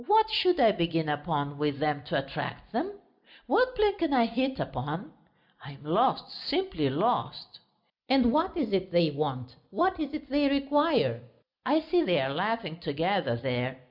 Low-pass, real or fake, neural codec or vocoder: 5.4 kHz; real; none